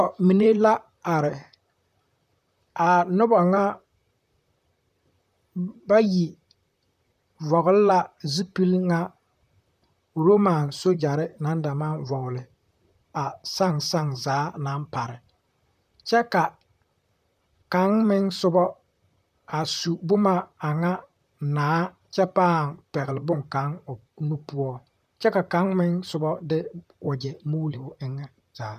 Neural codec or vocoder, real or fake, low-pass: vocoder, 44.1 kHz, 128 mel bands, Pupu-Vocoder; fake; 14.4 kHz